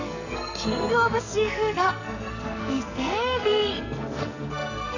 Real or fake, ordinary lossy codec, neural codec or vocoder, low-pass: fake; none; codec, 44.1 kHz, 2.6 kbps, SNAC; 7.2 kHz